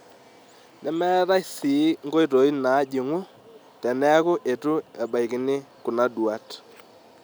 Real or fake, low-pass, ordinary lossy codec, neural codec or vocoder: real; none; none; none